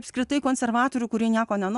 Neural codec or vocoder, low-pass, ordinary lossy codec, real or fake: none; 10.8 kHz; Opus, 64 kbps; real